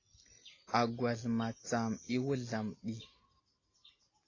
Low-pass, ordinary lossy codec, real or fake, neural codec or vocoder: 7.2 kHz; AAC, 32 kbps; real; none